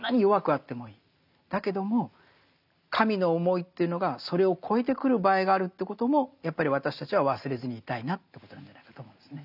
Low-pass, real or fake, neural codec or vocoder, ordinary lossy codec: 5.4 kHz; real; none; none